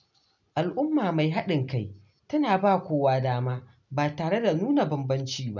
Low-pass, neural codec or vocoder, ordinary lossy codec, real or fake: 7.2 kHz; none; none; real